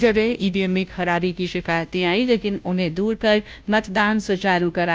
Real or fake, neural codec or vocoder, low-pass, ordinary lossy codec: fake; codec, 16 kHz, 0.5 kbps, FunCodec, trained on Chinese and English, 25 frames a second; none; none